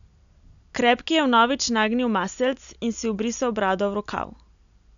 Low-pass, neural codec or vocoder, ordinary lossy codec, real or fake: 7.2 kHz; none; none; real